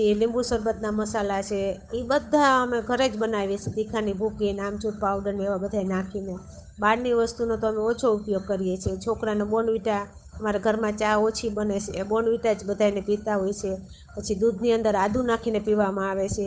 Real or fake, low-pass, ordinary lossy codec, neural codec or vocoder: fake; none; none; codec, 16 kHz, 8 kbps, FunCodec, trained on Chinese and English, 25 frames a second